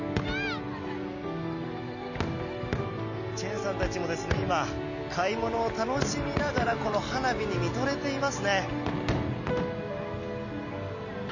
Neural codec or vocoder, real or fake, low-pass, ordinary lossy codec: none; real; 7.2 kHz; none